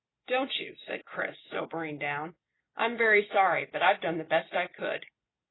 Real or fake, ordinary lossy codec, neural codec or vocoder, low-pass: real; AAC, 16 kbps; none; 7.2 kHz